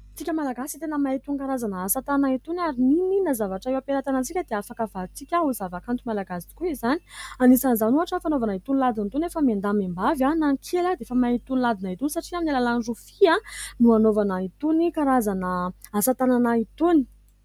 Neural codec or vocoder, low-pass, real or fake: none; 19.8 kHz; real